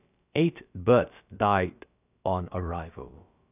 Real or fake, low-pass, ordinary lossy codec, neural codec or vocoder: fake; 3.6 kHz; none; codec, 16 kHz, about 1 kbps, DyCAST, with the encoder's durations